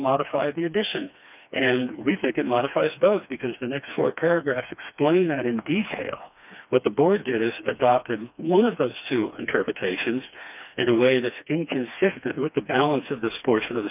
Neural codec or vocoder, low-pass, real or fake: codec, 16 kHz, 2 kbps, FreqCodec, smaller model; 3.6 kHz; fake